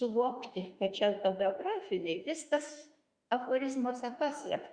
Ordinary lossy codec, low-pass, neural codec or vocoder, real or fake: Opus, 64 kbps; 9.9 kHz; autoencoder, 48 kHz, 32 numbers a frame, DAC-VAE, trained on Japanese speech; fake